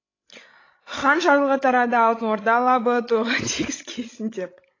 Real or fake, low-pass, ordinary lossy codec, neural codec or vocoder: fake; 7.2 kHz; AAC, 32 kbps; codec, 16 kHz, 16 kbps, FreqCodec, larger model